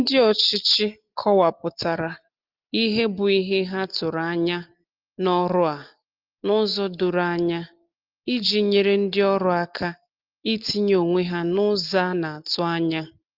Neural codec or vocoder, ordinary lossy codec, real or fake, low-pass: none; Opus, 24 kbps; real; 5.4 kHz